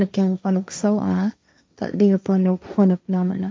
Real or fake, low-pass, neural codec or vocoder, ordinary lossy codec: fake; none; codec, 16 kHz, 1.1 kbps, Voila-Tokenizer; none